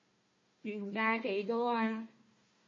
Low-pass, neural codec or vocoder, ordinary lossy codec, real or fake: 7.2 kHz; codec, 16 kHz, 1 kbps, FunCodec, trained on Chinese and English, 50 frames a second; MP3, 32 kbps; fake